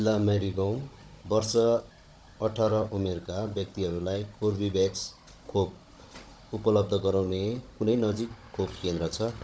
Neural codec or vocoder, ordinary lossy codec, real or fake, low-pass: codec, 16 kHz, 16 kbps, FunCodec, trained on Chinese and English, 50 frames a second; none; fake; none